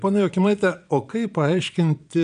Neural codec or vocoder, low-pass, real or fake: vocoder, 22.05 kHz, 80 mel bands, Vocos; 9.9 kHz; fake